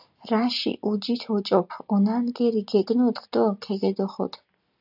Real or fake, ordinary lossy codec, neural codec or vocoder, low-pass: real; AAC, 48 kbps; none; 5.4 kHz